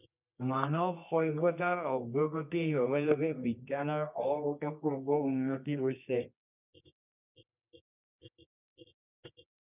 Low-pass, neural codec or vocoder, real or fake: 3.6 kHz; codec, 24 kHz, 0.9 kbps, WavTokenizer, medium music audio release; fake